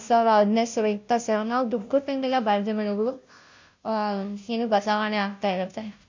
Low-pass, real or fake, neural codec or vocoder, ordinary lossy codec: 7.2 kHz; fake; codec, 16 kHz, 0.5 kbps, FunCodec, trained on Chinese and English, 25 frames a second; MP3, 48 kbps